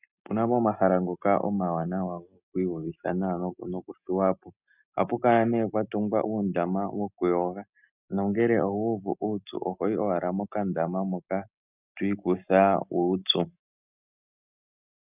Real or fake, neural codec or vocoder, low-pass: real; none; 3.6 kHz